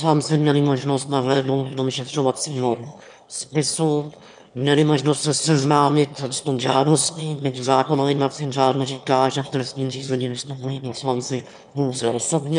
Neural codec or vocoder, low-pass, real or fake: autoencoder, 22.05 kHz, a latent of 192 numbers a frame, VITS, trained on one speaker; 9.9 kHz; fake